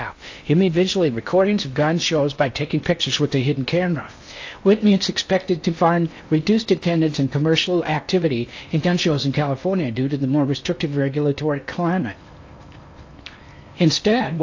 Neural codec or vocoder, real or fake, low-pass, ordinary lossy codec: codec, 16 kHz in and 24 kHz out, 0.8 kbps, FocalCodec, streaming, 65536 codes; fake; 7.2 kHz; AAC, 48 kbps